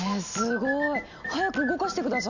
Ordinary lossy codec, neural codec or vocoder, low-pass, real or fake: none; none; 7.2 kHz; real